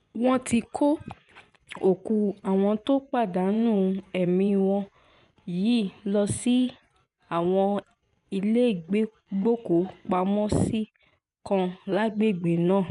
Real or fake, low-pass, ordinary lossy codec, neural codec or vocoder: fake; 10.8 kHz; none; vocoder, 24 kHz, 100 mel bands, Vocos